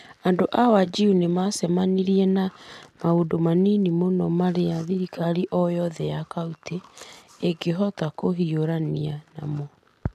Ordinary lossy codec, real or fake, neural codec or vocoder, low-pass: none; real; none; 14.4 kHz